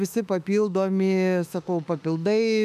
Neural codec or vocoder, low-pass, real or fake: autoencoder, 48 kHz, 32 numbers a frame, DAC-VAE, trained on Japanese speech; 14.4 kHz; fake